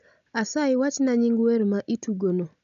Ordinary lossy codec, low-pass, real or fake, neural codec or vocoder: none; 7.2 kHz; real; none